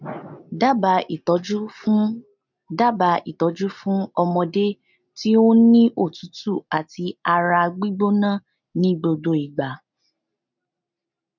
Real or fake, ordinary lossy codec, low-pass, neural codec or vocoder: real; none; 7.2 kHz; none